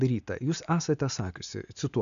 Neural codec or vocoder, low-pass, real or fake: none; 7.2 kHz; real